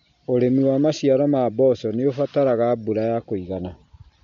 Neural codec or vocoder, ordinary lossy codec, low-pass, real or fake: none; MP3, 64 kbps; 7.2 kHz; real